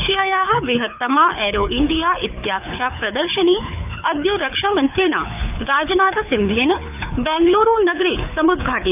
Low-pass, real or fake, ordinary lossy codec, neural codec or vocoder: 3.6 kHz; fake; none; codec, 24 kHz, 6 kbps, HILCodec